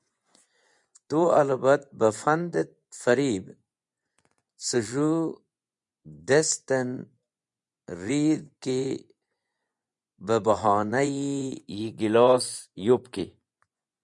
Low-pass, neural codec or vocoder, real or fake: 10.8 kHz; vocoder, 24 kHz, 100 mel bands, Vocos; fake